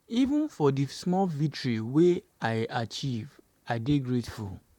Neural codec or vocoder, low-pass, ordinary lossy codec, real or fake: vocoder, 44.1 kHz, 128 mel bands, Pupu-Vocoder; 19.8 kHz; none; fake